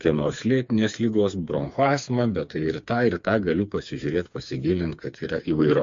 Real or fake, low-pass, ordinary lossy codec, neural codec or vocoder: fake; 7.2 kHz; MP3, 48 kbps; codec, 16 kHz, 4 kbps, FreqCodec, smaller model